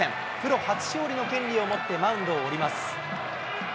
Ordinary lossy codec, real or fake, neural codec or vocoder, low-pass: none; real; none; none